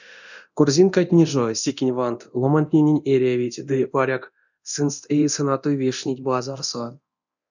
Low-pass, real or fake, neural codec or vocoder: 7.2 kHz; fake; codec, 24 kHz, 0.9 kbps, DualCodec